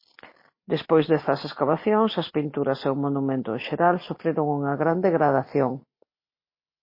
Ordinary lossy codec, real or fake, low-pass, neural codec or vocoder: MP3, 24 kbps; real; 5.4 kHz; none